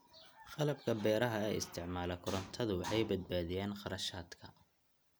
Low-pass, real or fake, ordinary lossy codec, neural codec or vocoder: none; real; none; none